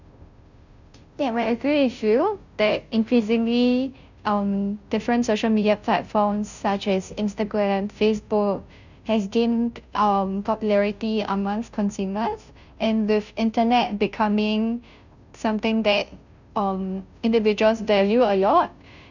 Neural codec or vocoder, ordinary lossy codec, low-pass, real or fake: codec, 16 kHz, 0.5 kbps, FunCodec, trained on Chinese and English, 25 frames a second; none; 7.2 kHz; fake